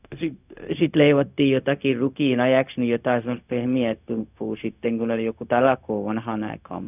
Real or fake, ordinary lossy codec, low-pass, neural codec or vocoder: fake; none; 3.6 kHz; codec, 16 kHz, 0.4 kbps, LongCat-Audio-Codec